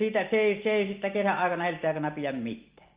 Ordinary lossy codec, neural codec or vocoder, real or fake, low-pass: Opus, 24 kbps; none; real; 3.6 kHz